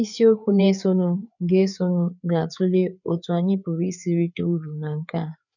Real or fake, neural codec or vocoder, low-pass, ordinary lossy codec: fake; codec, 16 kHz, 4 kbps, FreqCodec, larger model; 7.2 kHz; none